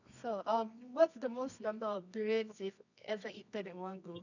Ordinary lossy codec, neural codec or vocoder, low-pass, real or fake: none; codec, 24 kHz, 0.9 kbps, WavTokenizer, medium music audio release; 7.2 kHz; fake